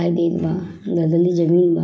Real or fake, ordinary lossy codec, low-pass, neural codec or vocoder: real; none; none; none